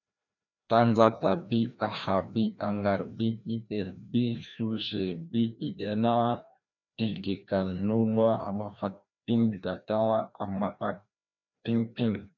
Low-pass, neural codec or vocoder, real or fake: 7.2 kHz; codec, 16 kHz, 1 kbps, FreqCodec, larger model; fake